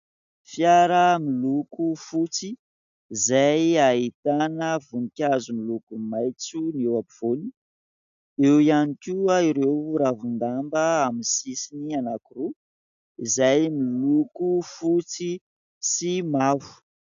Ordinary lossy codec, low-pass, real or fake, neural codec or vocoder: AAC, 96 kbps; 7.2 kHz; real; none